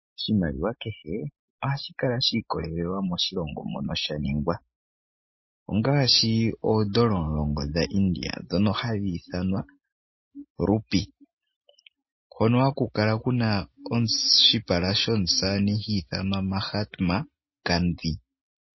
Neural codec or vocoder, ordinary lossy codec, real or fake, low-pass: none; MP3, 24 kbps; real; 7.2 kHz